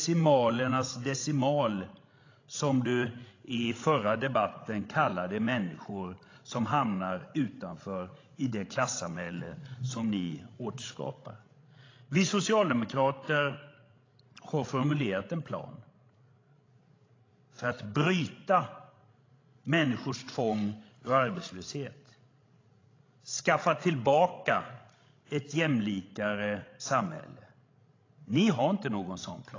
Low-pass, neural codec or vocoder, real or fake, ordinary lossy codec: 7.2 kHz; codec, 16 kHz, 16 kbps, FreqCodec, larger model; fake; AAC, 32 kbps